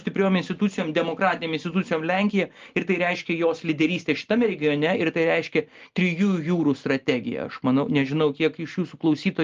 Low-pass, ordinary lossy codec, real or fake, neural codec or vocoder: 7.2 kHz; Opus, 32 kbps; real; none